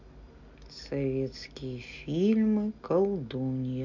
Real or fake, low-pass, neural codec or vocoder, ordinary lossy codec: real; 7.2 kHz; none; none